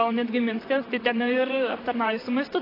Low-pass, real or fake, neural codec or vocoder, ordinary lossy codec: 5.4 kHz; fake; vocoder, 44.1 kHz, 128 mel bands, Pupu-Vocoder; AAC, 24 kbps